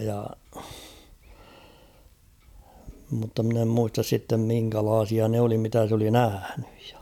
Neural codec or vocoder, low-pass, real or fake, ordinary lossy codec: none; 19.8 kHz; real; none